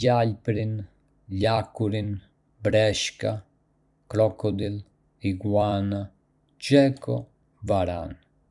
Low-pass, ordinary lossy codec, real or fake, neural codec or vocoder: 10.8 kHz; none; fake; vocoder, 44.1 kHz, 128 mel bands every 256 samples, BigVGAN v2